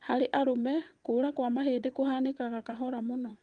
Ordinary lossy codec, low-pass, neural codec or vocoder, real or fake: Opus, 24 kbps; 9.9 kHz; vocoder, 22.05 kHz, 80 mel bands, WaveNeXt; fake